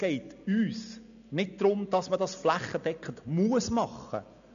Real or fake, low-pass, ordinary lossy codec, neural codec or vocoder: real; 7.2 kHz; none; none